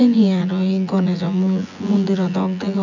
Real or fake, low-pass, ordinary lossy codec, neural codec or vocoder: fake; 7.2 kHz; none; vocoder, 24 kHz, 100 mel bands, Vocos